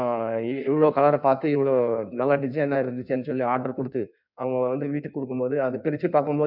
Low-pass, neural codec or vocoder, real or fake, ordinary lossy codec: 5.4 kHz; codec, 16 kHz in and 24 kHz out, 1.1 kbps, FireRedTTS-2 codec; fake; none